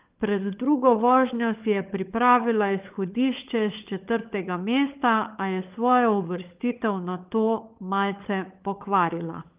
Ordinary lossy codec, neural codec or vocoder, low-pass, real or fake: Opus, 32 kbps; codec, 16 kHz, 16 kbps, FunCodec, trained on LibriTTS, 50 frames a second; 3.6 kHz; fake